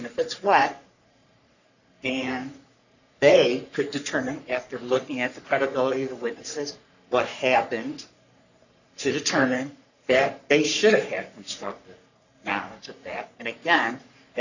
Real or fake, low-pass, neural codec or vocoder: fake; 7.2 kHz; codec, 44.1 kHz, 3.4 kbps, Pupu-Codec